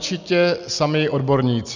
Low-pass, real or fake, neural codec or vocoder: 7.2 kHz; real; none